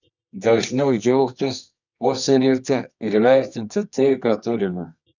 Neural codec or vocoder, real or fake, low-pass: codec, 24 kHz, 0.9 kbps, WavTokenizer, medium music audio release; fake; 7.2 kHz